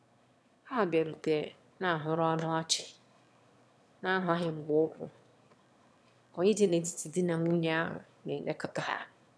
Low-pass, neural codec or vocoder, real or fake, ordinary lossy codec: none; autoencoder, 22.05 kHz, a latent of 192 numbers a frame, VITS, trained on one speaker; fake; none